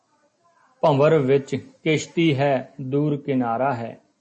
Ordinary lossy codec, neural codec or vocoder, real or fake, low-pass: MP3, 32 kbps; none; real; 10.8 kHz